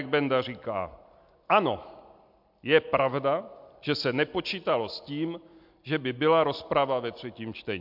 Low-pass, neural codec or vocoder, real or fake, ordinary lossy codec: 5.4 kHz; none; real; MP3, 48 kbps